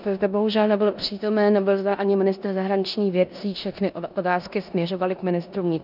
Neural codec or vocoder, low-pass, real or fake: codec, 16 kHz in and 24 kHz out, 0.9 kbps, LongCat-Audio-Codec, four codebook decoder; 5.4 kHz; fake